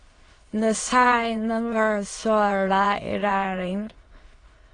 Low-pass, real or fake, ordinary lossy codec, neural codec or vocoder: 9.9 kHz; fake; AAC, 32 kbps; autoencoder, 22.05 kHz, a latent of 192 numbers a frame, VITS, trained on many speakers